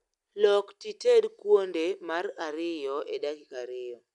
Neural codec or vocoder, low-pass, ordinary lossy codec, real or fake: none; 10.8 kHz; none; real